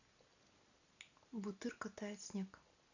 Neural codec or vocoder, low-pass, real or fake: none; 7.2 kHz; real